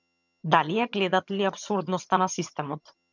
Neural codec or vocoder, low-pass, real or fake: vocoder, 22.05 kHz, 80 mel bands, HiFi-GAN; 7.2 kHz; fake